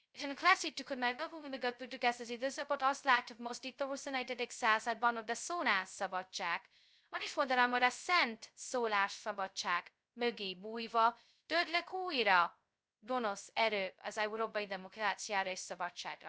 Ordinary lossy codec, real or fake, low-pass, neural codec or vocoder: none; fake; none; codec, 16 kHz, 0.2 kbps, FocalCodec